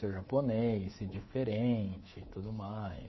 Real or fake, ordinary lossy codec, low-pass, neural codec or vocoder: fake; MP3, 24 kbps; 7.2 kHz; codec, 24 kHz, 3.1 kbps, DualCodec